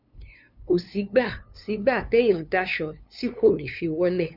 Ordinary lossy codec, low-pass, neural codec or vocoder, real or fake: none; 5.4 kHz; codec, 16 kHz, 2 kbps, FunCodec, trained on LibriTTS, 25 frames a second; fake